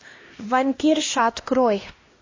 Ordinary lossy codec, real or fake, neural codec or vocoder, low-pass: MP3, 32 kbps; fake; codec, 16 kHz, 1 kbps, X-Codec, HuBERT features, trained on LibriSpeech; 7.2 kHz